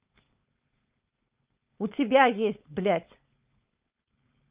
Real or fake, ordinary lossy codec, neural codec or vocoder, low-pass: fake; Opus, 24 kbps; codec, 16 kHz, 4.8 kbps, FACodec; 3.6 kHz